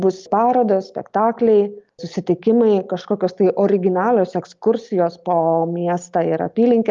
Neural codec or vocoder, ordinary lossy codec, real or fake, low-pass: none; Opus, 32 kbps; real; 7.2 kHz